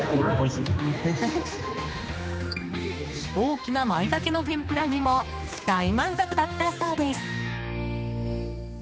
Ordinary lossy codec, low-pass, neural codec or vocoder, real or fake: none; none; codec, 16 kHz, 2 kbps, X-Codec, HuBERT features, trained on general audio; fake